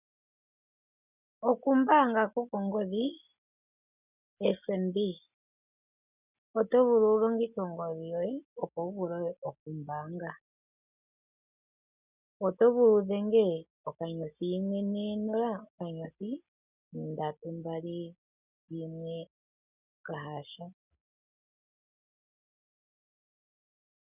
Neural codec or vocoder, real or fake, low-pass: none; real; 3.6 kHz